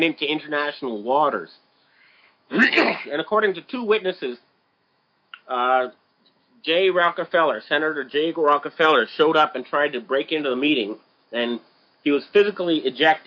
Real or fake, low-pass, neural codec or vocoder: fake; 7.2 kHz; autoencoder, 48 kHz, 128 numbers a frame, DAC-VAE, trained on Japanese speech